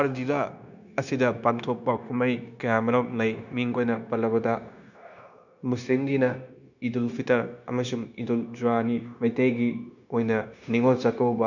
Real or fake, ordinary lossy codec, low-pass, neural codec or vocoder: fake; none; 7.2 kHz; codec, 16 kHz, 0.9 kbps, LongCat-Audio-Codec